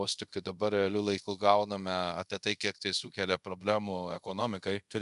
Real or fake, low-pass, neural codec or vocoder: fake; 10.8 kHz; codec, 24 kHz, 0.5 kbps, DualCodec